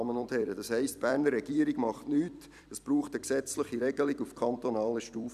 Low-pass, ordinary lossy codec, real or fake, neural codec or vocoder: 14.4 kHz; none; fake; vocoder, 44.1 kHz, 128 mel bands every 256 samples, BigVGAN v2